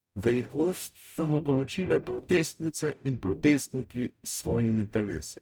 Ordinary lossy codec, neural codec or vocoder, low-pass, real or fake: none; codec, 44.1 kHz, 0.9 kbps, DAC; none; fake